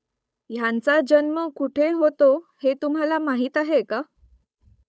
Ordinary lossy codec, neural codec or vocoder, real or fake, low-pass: none; codec, 16 kHz, 8 kbps, FunCodec, trained on Chinese and English, 25 frames a second; fake; none